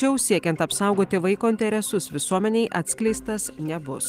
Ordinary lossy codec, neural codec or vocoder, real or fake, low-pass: Opus, 24 kbps; none; real; 14.4 kHz